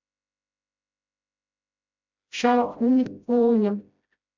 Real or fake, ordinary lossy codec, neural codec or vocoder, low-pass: fake; MP3, 64 kbps; codec, 16 kHz, 0.5 kbps, FreqCodec, smaller model; 7.2 kHz